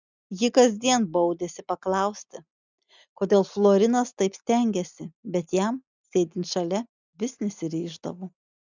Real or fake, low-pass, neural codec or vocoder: real; 7.2 kHz; none